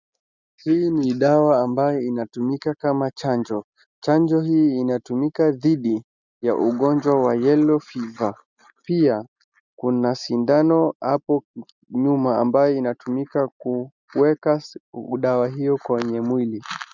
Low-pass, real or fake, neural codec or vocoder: 7.2 kHz; real; none